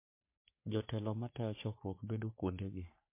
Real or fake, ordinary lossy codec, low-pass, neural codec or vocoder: fake; MP3, 24 kbps; 3.6 kHz; codec, 44.1 kHz, 3.4 kbps, Pupu-Codec